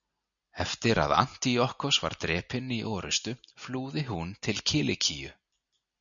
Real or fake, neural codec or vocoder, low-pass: real; none; 7.2 kHz